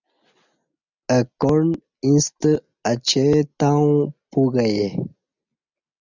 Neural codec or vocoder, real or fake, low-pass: none; real; 7.2 kHz